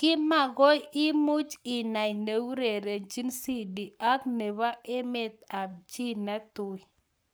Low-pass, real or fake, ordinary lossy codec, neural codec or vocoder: none; fake; none; codec, 44.1 kHz, 7.8 kbps, Pupu-Codec